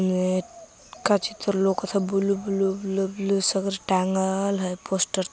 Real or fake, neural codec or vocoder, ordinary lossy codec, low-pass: real; none; none; none